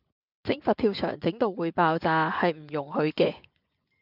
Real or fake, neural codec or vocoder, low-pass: fake; vocoder, 24 kHz, 100 mel bands, Vocos; 5.4 kHz